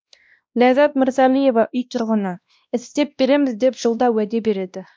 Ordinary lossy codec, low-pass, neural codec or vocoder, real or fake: none; none; codec, 16 kHz, 1 kbps, X-Codec, WavLM features, trained on Multilingual LibriSpeech; fake